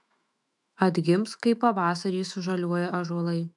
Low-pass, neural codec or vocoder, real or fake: 10.8 kHz; autoencoder, 48 kHz, 128 numbers a frame, DAC-VAE, trained on Japanese speech; fake